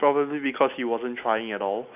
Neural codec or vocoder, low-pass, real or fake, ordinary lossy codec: none; 3.6 kHz; real; none